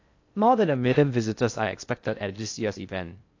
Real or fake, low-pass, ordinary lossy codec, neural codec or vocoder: fake; 7.2 kHz; AAC, 48 kbps; codec, 16 kHz in and 24 kHz out, 0.6 kbps, FocalCodec, streaming, 2048 codes